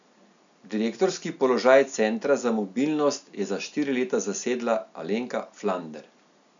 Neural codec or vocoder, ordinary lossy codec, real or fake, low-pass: none; none; real; 7.2 kHz